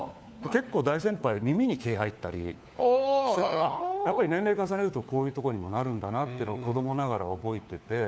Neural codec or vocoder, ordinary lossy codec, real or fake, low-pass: codec, 16 kHz, 4 kbps, FunCodec, trained on LibriTTS, 50 frames a second; none; fake; none